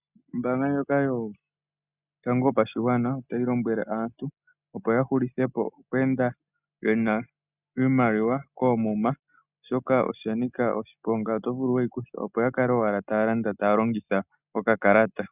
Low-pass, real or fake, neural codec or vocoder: 3.6 kHz; real; none